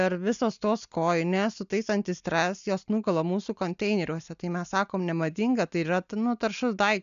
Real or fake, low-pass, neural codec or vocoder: real; 7.2 kHz; none